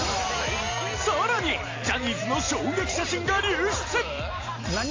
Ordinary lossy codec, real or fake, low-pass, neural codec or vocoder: AAC, 32 kbps; real; 7.2 kHz; none